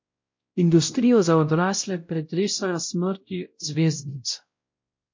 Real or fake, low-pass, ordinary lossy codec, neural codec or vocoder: fake; 7.2 kHz; MP3, 48 kbps; codec, 16 kHz, 0.5 kbps, X-Codec, WavLM features, trained on Multilingual LibriSpeech